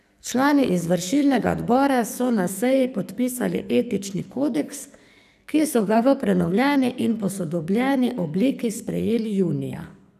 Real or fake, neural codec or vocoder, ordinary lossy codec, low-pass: fake; codec, 44.1 kHz, 2.6 kbps, SNAC; none; 14.4 kHz